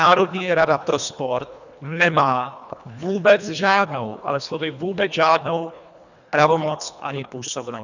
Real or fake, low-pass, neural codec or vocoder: fake; 7.2 kHz; codec, 24 kHz, 1.5 kbps, HILCodec